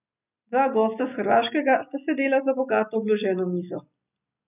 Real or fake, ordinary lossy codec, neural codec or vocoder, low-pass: real; none; none; 3.6 kHz